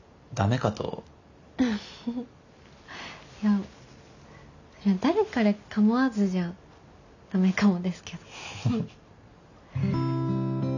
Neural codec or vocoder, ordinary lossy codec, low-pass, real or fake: none; none; 7.2 kHz; real